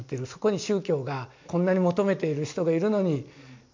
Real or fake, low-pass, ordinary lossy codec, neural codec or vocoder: real; 7.2 kHz; MP3, 48 kbps; none